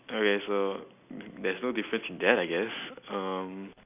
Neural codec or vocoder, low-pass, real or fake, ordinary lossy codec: none; 3.6 kHz; real; none